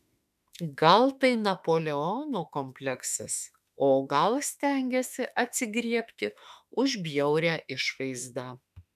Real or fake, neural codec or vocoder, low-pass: fake; autoencoder, 48 kHz, 32 numbers a frame, DAC-VAE, trained on Japanese speech; 14.4 kHz